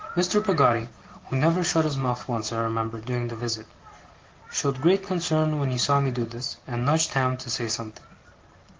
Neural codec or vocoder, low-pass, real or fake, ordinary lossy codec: none; 7.2 kHz; real; Opus, 16 kbps